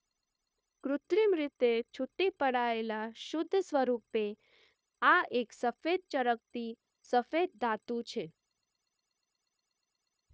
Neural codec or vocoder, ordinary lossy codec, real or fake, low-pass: codec, 16 kHz, 0.9 kbps, LongCat-Audio-Codec; none; fake; none